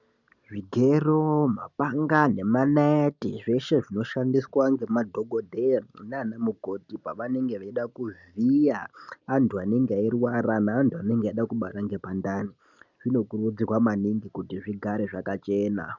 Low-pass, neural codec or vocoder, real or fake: 7.2 kHz; none; real